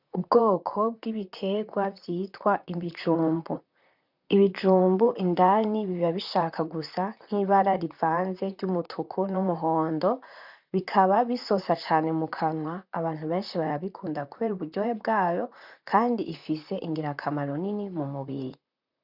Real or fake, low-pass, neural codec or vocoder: fake; 5.4 kHz; vocoder, 44.1 kHz, 128 mel bands, Pupu-Vocoder